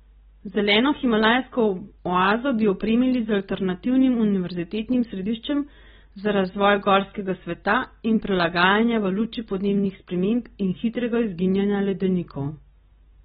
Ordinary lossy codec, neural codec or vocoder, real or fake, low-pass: AAC, 16 kbps; none; real; 19.8 kHz